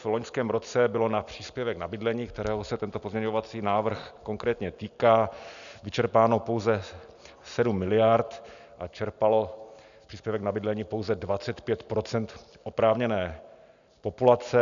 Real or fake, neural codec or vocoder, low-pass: real; none; 7.2 kHz